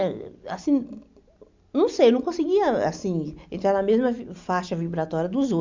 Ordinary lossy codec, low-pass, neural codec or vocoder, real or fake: MP3, 64 kbps; 7.2 kHz; none; real